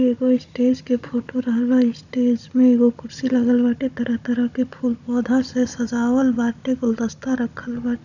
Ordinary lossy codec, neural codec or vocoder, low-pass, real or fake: none; codec, 16 kHz, 16 kbps, FunCodec, trained on Chinese and English, 50 frames a second; 7.2 kHz; fake